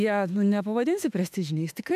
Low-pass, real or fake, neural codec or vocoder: 14.4 kHz; fake; autoencoder, 48 kHz, 32 numbers a frame, DAC-VAE, trained on Japanese speech